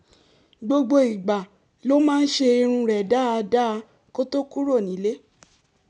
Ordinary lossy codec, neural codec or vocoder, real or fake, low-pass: none; none; real; 10.8 kHz